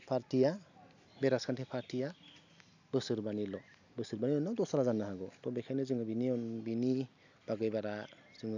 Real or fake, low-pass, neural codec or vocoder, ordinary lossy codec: real; 7.2 kHz; none; none